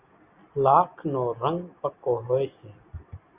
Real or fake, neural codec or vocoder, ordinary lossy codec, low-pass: real; none; Opus, 64 kbps; 3.6 kHz